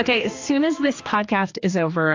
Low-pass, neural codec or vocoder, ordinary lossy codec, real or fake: 7.2 kHz; codec, 16 kHz, 2 kbps, X-Codec, HuBERT features, trained on general audio; AAC, 48 kbps; fake